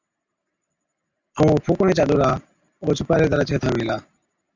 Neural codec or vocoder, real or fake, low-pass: none; real; 7.2 kHz